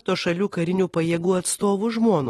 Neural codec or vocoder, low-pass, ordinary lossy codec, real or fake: none; 19.8 kHz; AAC, 32 kbps; real